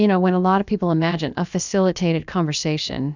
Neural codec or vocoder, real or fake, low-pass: codec, 16 kHz, about 1 kbps, DyCAST, with the encoder's durations; fake; 7.2 kHz